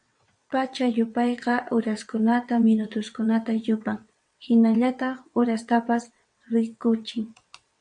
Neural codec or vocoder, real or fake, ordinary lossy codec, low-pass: vocoder, 22.05 kHz, 80 mel bands, WaveNeXt; fake; MP3, 64 kbps; 9.9 kHz